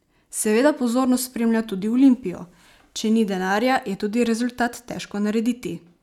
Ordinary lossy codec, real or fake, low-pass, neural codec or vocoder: none; real; 19.8 kHz; none